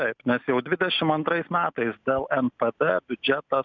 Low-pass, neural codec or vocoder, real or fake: 7.2 kHz; none; real